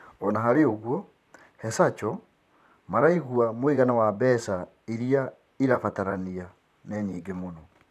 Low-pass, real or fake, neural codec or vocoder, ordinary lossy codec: 14.4 kHz; fake; vocoder, 44.1 kHz, 128 mel bands, Pupu-Vocoder; none